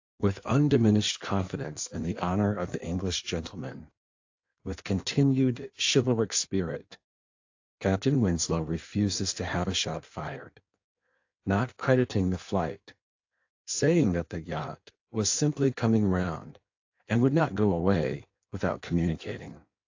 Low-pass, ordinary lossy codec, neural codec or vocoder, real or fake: 7.2 kHz; AAC, 48 kbps; codec, 16 kHz in and 24 kHz out, 1.1 kbps, FireRedTTS-2 codec; fake